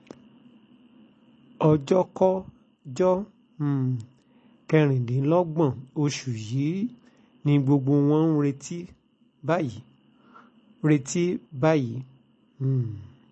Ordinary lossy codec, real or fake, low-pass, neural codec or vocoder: MP3, 32 kbps; real; 10.8 kHz; none